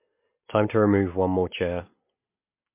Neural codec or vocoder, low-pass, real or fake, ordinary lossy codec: none; 3.6 kHz; real; MP3, 24 kbps